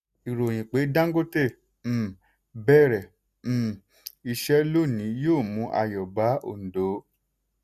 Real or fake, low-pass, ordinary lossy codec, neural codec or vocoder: real; 14.4 kHz; none; none